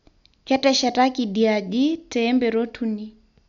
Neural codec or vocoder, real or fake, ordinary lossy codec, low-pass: none; real; none; 7.2 kHz